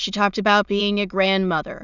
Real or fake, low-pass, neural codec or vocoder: fake; 7.2 kHz; autoencoder, 22.05 kHz, a latent of 192 numbers a frame, VITS, trained on many speakers